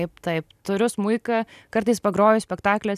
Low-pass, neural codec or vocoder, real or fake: 14.4 kHz; none; real